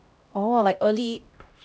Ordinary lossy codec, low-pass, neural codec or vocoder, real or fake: none; none; codec, 16 kHz, 0.5 kbps, X-Codec, HuBERT features, trained on LibriSpeech; fake